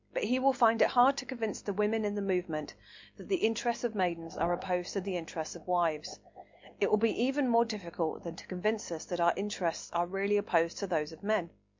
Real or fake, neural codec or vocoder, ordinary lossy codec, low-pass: real; none; MP3, 48 kbps; 7.2 kHz